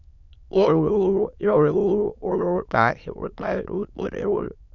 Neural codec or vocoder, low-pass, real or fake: autoencoder, 22.05 kHz, a latent of 192 numbers a frame, VITS, trained on many speakers; 7.2 kHz; fake